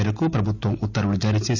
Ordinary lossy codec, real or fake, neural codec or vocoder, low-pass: none; real; none; 7.2 kHz